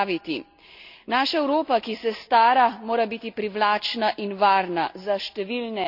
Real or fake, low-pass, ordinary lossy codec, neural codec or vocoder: real; 5.4 kHz; none; none